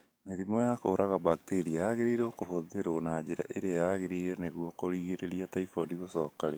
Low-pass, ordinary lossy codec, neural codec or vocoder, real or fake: none; none; codec, 44.1 kHz, 7.8 kbps, DAC; fake